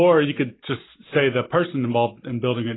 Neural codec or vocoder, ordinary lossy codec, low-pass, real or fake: none; AAC, 16 kbps; 7.2 kHz; real